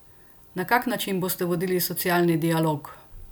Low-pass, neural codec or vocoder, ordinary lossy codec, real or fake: none; none; none; real